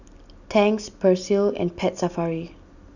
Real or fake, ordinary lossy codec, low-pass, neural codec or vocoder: real; none; 7.2 kHz; none